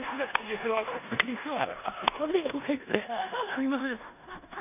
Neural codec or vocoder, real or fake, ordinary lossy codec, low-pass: codec, 16 kHz in and 24 kHz out, 0.9 kbps, LongCat-Audio-Codec, four codebook decoder; fake; none; 3.6 kHz